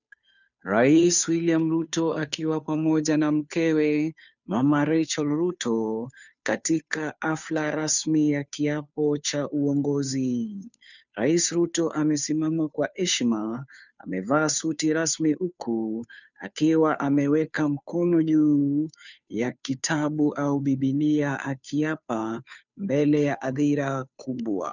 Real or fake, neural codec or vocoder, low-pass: fake; codec, 16 kHz, 2 kbps, FunCodec, trained on Chinese and English, 25 frames a second; 7.2 kHz